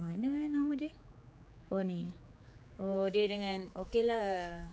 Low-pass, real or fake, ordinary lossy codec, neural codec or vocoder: none; fake; none; codec, 16 kHz, 4 kbps, X-Codec, HuBERT features, trained on general audio